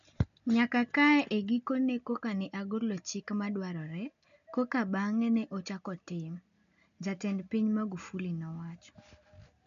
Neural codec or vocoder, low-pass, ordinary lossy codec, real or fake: none; 7.2 kHz; none; real